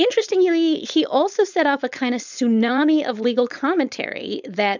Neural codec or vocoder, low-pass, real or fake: codec, 16 kHz, 4.8 kbps, FACodec; 7.2 kHz; fake